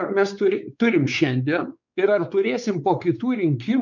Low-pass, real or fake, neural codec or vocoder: 7.2 kHz; fake; codec, 16 kHz, 4 kbps, X-Codec, WavLM features, trained on Multilingual LibriSpeech